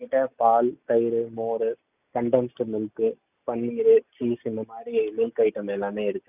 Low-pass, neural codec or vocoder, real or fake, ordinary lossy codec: 3.6 kHz; none; real; none